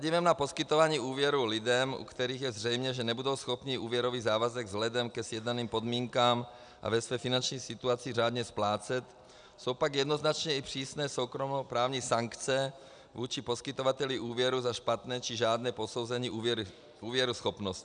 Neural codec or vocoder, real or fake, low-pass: none; real; 9.9 kHz